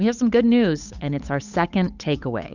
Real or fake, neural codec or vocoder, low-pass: fake; codec, 16 kHz, 8 kbps, FunCodec, trained on Chinese and English, 25 frames a second; 7.2 kHz